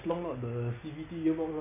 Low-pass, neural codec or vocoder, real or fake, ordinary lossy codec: 3.6 kHz; none; real; none